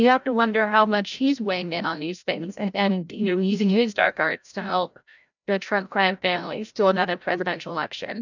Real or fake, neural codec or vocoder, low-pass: fake; codec, 16 kHz, 0.5 kbps, FreqCodec, larger model; 7.2 kHz